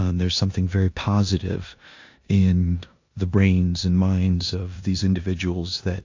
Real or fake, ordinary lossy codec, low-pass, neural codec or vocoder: fake; AAC, 48 kbps; 7.2 kHz; codec, 16 kHz in and 24 kHz out, 0.9 kbps, LongCat-Audio-Codec, four codebook decoder